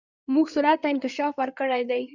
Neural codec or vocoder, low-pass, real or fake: codec, 16 kHz in and 24 kHz out, 2.2 kbps, FireRedTTS-2 codec; 7.2 kHz; fake